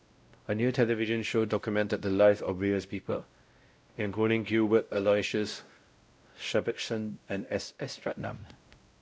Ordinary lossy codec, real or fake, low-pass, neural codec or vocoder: none; fake; none; codec, 16 kHz, 0.5 kbps, X-Codec, WavLM features, trained on Multilingual LibriSpeech